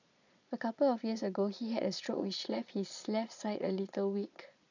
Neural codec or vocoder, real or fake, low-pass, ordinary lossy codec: vocoder, 22.05 kHz, 80 mel bands, Vocos; fake; 7.2 kHz; none